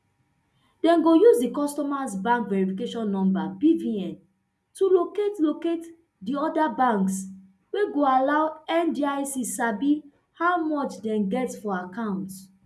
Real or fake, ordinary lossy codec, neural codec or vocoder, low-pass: real; none; none; none